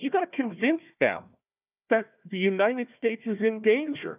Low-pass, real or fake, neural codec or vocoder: 3.6 kHz; fake; codec, 16 kHz, 1 kbps, FunCodec, trained on Chinese and English, 50 frames a second